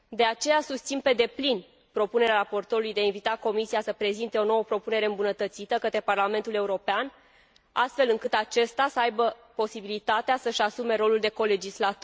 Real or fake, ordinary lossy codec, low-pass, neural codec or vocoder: real; none; none; none